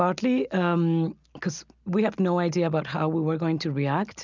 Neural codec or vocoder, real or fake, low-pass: none; real; 7.2 kHz